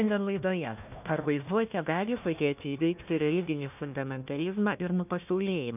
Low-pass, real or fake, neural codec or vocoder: 3.6 kHz; fake; codec, 16 kHz, 1 kbps, FunCodec, trained on Chinese and English, 50 frames a second